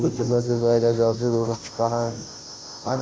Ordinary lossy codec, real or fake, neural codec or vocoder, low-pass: none; fake; codec, 16 kHz, 0.5 kbps, FunCodec, trained on Chinese and English, 25 frames a second; none